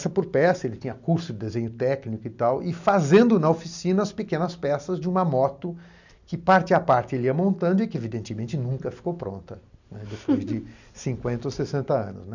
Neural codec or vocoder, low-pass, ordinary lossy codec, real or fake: none; 7.2 kHz; none; real